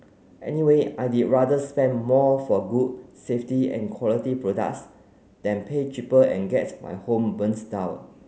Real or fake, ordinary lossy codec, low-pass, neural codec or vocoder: real; none; none; none